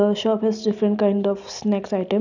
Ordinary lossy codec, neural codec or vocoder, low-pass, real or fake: none; none; 7.2 kHz; real